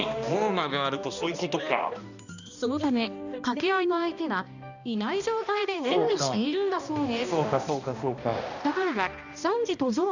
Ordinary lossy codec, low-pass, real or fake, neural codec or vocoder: none; 7.2 kHz; fake; codec, 16 kHz, 1 kbps, X-Codec, HuBERT features, trained on balanced general audio